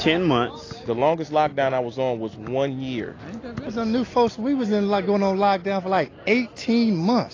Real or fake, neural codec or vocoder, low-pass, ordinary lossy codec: real; none; 7.2 kHz; AAC, 32 kbps